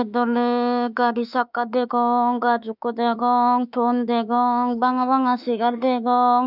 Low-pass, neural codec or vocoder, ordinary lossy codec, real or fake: 5.4 kHz; autoencoder, 48 kHz, 32 numbers a frame, DAC-VAE, trained on Japanese speech; none; fake